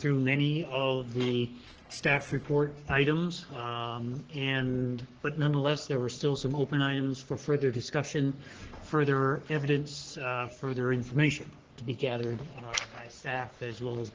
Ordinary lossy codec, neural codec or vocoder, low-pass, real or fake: Opus, 16 kbps; codec, 44.1 kHz, 3.4 kbps, Pupu-Codec; 7.2 kHz; fake